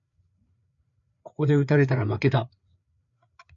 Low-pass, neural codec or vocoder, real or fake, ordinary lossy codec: 7.2 kHz; codec, 16 kHz, 4 kbps, FreqCodec, larger model; fake; MP3, 96 kbps